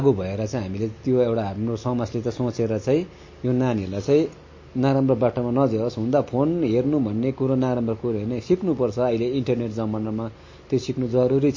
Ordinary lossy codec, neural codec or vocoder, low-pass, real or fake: MP3, 32 kbps; none; 7.2 kHz; real